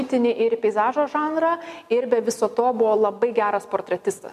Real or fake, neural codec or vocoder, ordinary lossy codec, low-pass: real; none; MP3, 96 kbps; 14.4 kHz